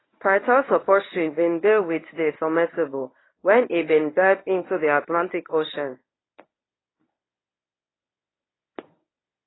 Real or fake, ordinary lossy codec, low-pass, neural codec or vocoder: fake; AAC, 16 kbps; 7.2 kHz; codec, 24 kHz, 0.9 kbps, WavTokenizer, medium speech release version 1